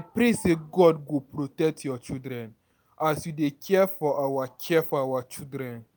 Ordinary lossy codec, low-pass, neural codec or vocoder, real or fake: none; none; none; real